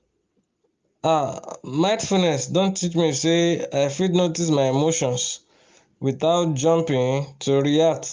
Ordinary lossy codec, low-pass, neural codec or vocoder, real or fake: Opus, 32 kbps; 7.2 kHz; none; real